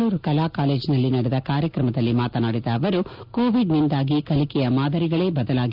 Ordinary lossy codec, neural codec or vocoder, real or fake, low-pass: Opus, 32 kbps; none; real; 5.4 kHz